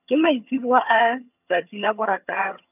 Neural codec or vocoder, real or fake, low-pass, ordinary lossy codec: vocoder, 22.05 kHz, 80 mel bands, HiFi-GAN; fake; 3.6 kHz; none